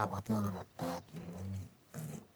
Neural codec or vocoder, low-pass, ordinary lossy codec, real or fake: codec, 44.1 kHz, 1.7 kbps, Pupu-Codec; none; none; fake